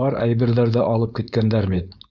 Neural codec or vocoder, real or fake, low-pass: codec, 16 kHz, 4.8 kbps, FACodec; fake; 7.2 kHz